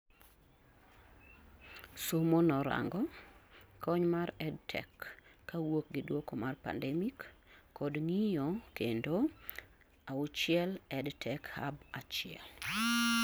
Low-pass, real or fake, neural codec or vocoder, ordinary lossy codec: none; real; none; none